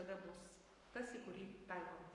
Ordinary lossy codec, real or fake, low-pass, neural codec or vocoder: Opus, 64 kbps; fake; 10.8 kHz; codec, 44.1 kHz, 7.8 kbps, Pupu-Codec